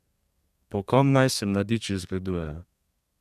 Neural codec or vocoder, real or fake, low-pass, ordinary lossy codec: codec, 32 kHz, 1.9 kbps, SNAC; fake; 14.4 kHz; none